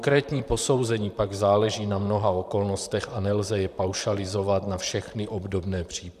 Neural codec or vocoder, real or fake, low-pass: vocoder, 44.1 kHz, 128 mel bands, Pupu-Vocoder; fake; 14.4 kHz